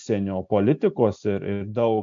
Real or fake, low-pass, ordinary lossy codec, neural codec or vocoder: real; 7.2 kHz; MP3, 64 kbps; none